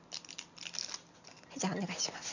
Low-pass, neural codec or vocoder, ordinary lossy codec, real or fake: 7.2 kHz; none; none; real